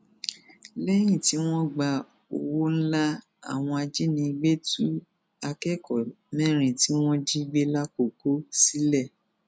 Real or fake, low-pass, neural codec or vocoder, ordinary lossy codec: real; none; none; none